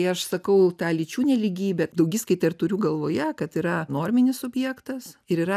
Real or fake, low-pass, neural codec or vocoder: real; 14.4 kHz; none